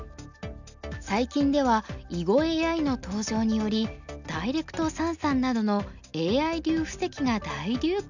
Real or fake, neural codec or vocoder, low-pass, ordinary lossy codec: real; none; 7.2 kHz; none